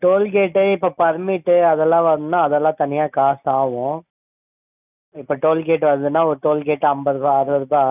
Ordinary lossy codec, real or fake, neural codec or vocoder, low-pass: none; real; none; 3.6 kHz